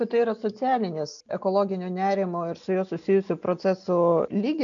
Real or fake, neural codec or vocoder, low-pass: real; none; 7.2 kHz